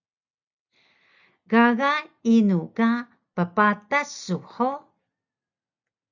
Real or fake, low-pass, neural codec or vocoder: real; 7.2 kHz; none